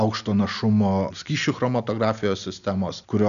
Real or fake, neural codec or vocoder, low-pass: real; none; 7.2 kHz